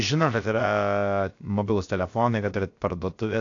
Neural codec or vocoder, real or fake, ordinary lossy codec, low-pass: codec, 16 kHz, 0.7 kbps, FocalCodec; fake; AAC, 48 kbps; 7.2 kHz